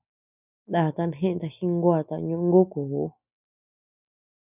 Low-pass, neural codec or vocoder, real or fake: 3.6 kHz; none; real